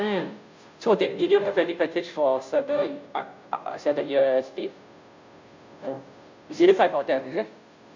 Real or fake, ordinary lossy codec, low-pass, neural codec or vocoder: fake; MP3, 64 kbps; 7.2 kHz; codec, 16 kHz, 0.5 kbps, FunCodec, trained on Chinese and English, 25 frames a second